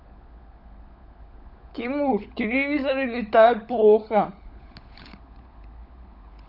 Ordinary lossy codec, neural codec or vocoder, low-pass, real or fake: none; codec, 16 kHz, 16 kbps, FunCodec, trained on LibriTTS, 50 frames a second; 5.4 kHz; fake